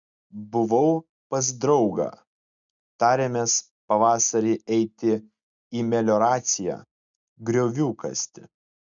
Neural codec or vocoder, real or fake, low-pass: none; real; 7.2 kHz